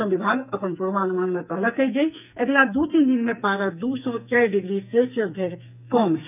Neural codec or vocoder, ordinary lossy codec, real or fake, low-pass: codec, 44.1 kHz, 2.6 kbps, SNAC; none; fake; 3.6 kHz